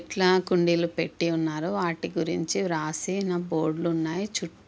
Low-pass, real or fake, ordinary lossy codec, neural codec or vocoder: none; real; none; none